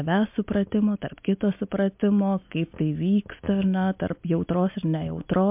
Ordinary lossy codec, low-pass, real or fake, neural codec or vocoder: MP3, 32 kbps; 3.6 kHz; real; none